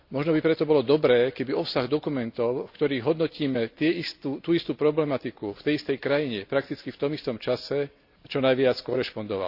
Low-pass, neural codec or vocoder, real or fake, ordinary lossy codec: 5.4 kHz; none; real; AAC, 48 kbps